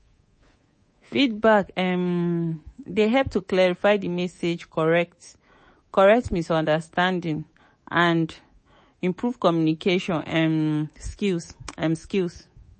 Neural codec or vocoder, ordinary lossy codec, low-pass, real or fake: codec, 24 kHz, 3.1 kbps, DualCodec; MP3, 32 kbps; 10.8 kHz; fake